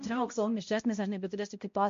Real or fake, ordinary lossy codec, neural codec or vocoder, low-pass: fake; MP3, 48 kbps; codec, 16 kHz, 0.5 kbps, X-Codec, HuBERT features, trained on balanced general audio; 7.2 kHz